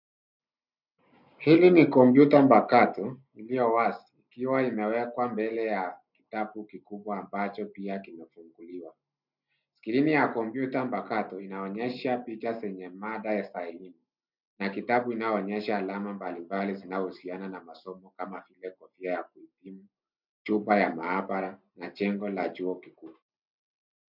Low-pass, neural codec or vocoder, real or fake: 5.4 kHz; none; real